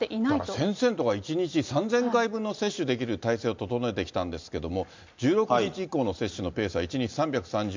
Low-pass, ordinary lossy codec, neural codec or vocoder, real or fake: 7.2 kHz; MP3, 64 kbps; none; real